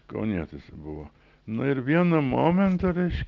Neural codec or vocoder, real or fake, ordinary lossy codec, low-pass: none; real; Opus, 32 kbps; 7.2 kHz